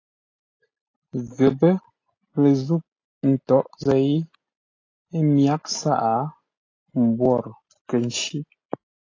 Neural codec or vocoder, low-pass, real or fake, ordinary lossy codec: none; 7.2 kHz; real; AAC, 32 kbps